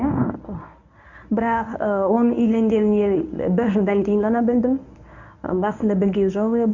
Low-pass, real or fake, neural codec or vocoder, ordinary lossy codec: 7.2 kHz; fake; codec, 24 kHz, 0.9 kbps, WavTokenizer, medium speech release version 1; none